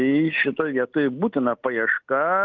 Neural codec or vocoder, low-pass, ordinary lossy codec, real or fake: none; 7.2 kHz; Opus, 32 kbps; real